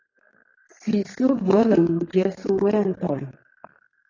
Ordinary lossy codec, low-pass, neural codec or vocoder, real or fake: AAC, 32 kbps; 7.2 kHz; codec, 32 kHz, 1.9 kbps, SNAC; fake